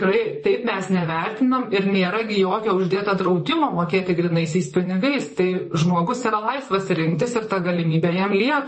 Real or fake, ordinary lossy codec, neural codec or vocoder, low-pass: fake; MP3, 32 kbps; vocoder, 44.1 kHz, 128 mel bands, Pupu-Vocoder; 10.8 kHz